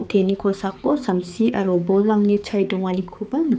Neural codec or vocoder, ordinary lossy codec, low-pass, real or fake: codec, 16 kHz, 4 kbps, X-Codec, HuBERT features, trained on balanced general audio; none; none; fake